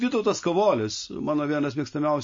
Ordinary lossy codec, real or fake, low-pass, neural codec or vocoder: MP3, 32 kbps; real; 7.2 kHz; none